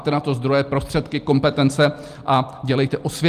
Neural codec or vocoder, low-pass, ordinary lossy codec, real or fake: none; 14.4 kHz; Opus, 32 kbps; real